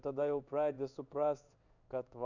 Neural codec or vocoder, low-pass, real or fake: codec, 16 kHz in and 24 kHz out, 1 kbps, XY-Tokenizer; 7.2 kHz; fake